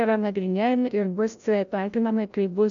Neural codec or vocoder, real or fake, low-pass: codec, 16 kHz, 0.5 kbps, FreqCodec, larger model; fake; 7.2 kHz